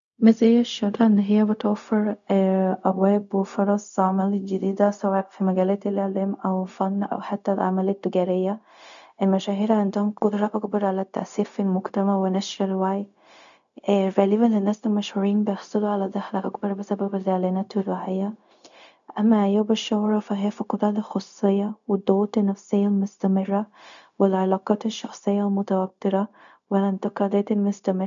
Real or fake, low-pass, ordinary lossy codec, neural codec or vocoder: fake; 7.2 kHz; none; codec, 16 kHz, 0.4 kbps, LongCat-Audio-Codec